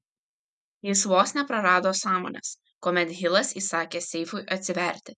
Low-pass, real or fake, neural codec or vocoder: 10.8 kHz; real; none